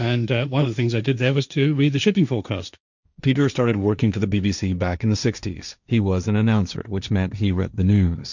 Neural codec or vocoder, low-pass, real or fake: codec, 16 kHz, 1.1 kbps, Voila-Tokenizer; 7.2 kHz; fake